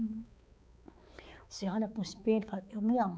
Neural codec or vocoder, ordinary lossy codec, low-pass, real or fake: codec, 16 kHz, 4 kbps, X-Codec, HuBERT features, trained on balanced general audio; none; none; fake